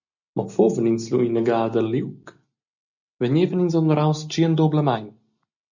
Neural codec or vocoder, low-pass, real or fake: none; 7.2 kHz; real